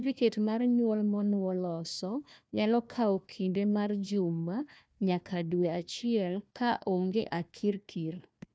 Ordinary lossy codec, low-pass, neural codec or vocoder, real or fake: none; none; codec, 16 kHz, 1 kbps, FunCodec, trained on Chinese and English, 50 frames a second; fake